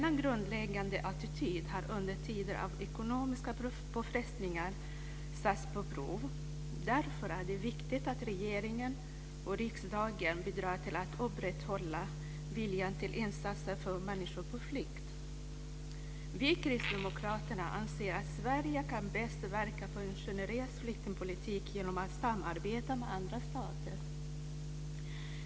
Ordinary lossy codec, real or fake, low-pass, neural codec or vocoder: none; real; none; none